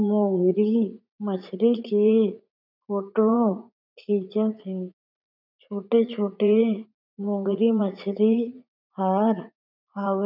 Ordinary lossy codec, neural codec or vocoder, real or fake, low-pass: none; vocoder, 44.1 kHz, 80 mel bands, Vocos; fake; 5.4 kHz